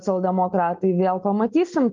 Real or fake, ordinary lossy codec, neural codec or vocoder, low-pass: real; Opus, 32 kbps; none; 7.2 kHz